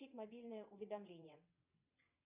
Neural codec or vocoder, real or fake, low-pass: vocoder, 22.05 kHz, 80 mel bands, WaveNeXt; fake; 3.6 kHz